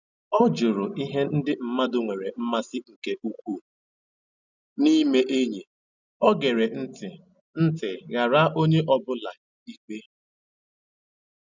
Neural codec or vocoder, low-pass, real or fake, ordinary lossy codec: none; 7.2 kHz; real; none